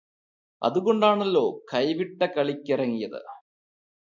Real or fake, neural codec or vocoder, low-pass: real; none; 7.2 kHz